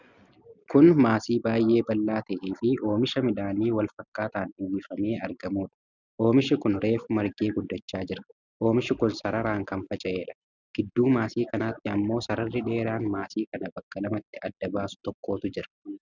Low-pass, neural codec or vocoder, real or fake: 7.2 kHz; none; real